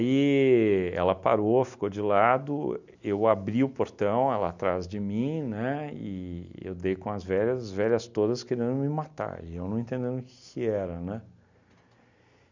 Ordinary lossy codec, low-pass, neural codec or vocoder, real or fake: none; 7.2 kHz; none; real